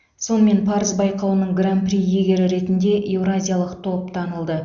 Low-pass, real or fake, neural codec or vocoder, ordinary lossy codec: 7.2 kHz; real; none; Opus, 64 kbps